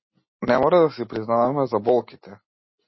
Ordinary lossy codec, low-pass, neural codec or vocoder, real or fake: MP3, 24 kbps; 7.2 kHz; none; real